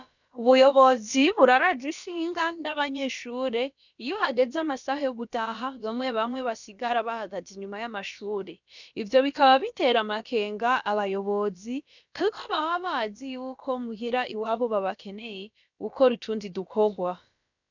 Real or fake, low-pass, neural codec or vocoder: fake; 7.2 kHz; codec, 16 kHz, about 1 kbps, DyCAST, with the encoder's durations